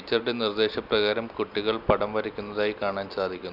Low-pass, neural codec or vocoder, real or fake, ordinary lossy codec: 5.4 kHz; none; real; none